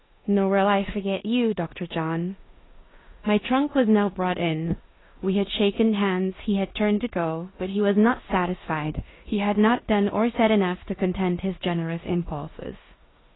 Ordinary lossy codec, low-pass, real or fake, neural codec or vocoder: AAC, 16 kbps; 7.2 kHz; fake; codec, 16 kHz in and 24 kHz out, 0.9 kbps, LongCat-Audio-Codec, four codebook decoder